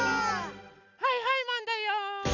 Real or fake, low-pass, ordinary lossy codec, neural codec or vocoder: real; 7.2 kHz; none; none